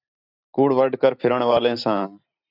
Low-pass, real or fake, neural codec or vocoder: 5.4 kHz; fake; vocoder, 44.1 kHz, 128 mel bands every 512 samples, BigVGAN v2